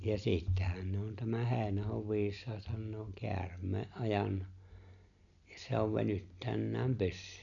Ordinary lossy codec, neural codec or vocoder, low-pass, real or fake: none; none; 7.2 kHz; real